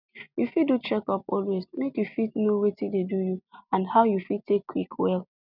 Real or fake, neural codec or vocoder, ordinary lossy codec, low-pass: real; none; none; 5.4 kHz